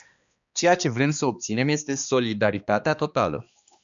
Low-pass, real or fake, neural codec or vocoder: 7.2 kHz; fake; codec, 16 kHz, 2 kbps, X-Codec, HuBERT features, trained on balanced general audio